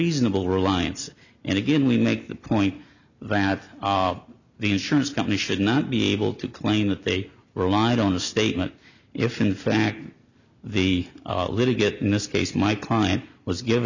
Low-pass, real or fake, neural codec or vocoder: 7.2 kHz; real; none